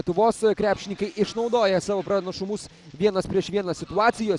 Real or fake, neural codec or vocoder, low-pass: real; none; 10.8 kHz